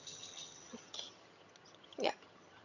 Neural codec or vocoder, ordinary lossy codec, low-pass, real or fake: vocoder, 22.05 kHz, 80 mel bands, HiFi-GAN; none; 7.2 kHz; fake